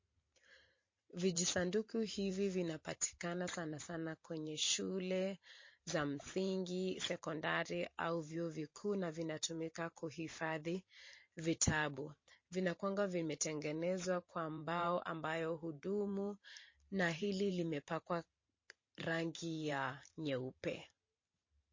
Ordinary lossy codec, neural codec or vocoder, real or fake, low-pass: MP3, 32 kbps; vocoder, 44.1 kHz, 128 mel bands every 512 samples, BigVGAN v2; fake; 7.2 kHz